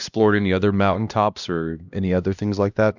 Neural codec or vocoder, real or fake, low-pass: codec, 16 kHz, 1 kbps, X-Codec, HuBERT features, trained on LibriSpeech; fake; 7.2 kHz